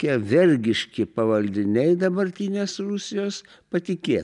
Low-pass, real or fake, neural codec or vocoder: 10.8 kHz; real; none